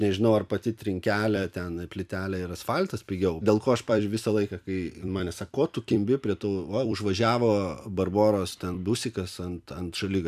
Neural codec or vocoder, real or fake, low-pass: vocoder, 44.1 kHz, 128 mel bands every 256 samples, BigVGAN v2; fake; 14.4 kHz